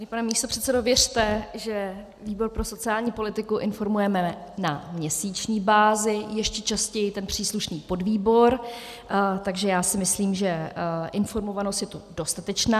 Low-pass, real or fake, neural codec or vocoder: 14.4 kHz; real; none